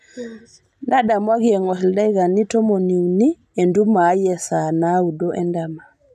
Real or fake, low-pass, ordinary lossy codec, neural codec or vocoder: real; 14.4 kHz; none; none